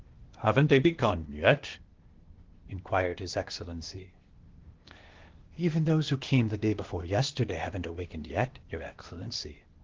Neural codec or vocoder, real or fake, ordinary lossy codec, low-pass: codec, 16 kHz, 0.8 kbps, ZipCodec; fake; Opus, 16 kbps; 7.2 kHz